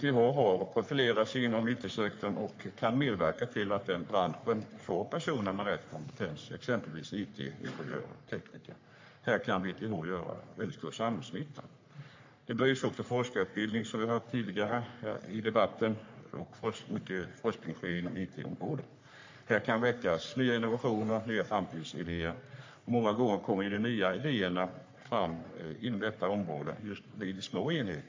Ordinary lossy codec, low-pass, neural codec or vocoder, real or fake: MP3, 48 kbps; 7.2 kHz; codec, 44.1 kHz, 3.4 kbps, Pupu-Codec; fake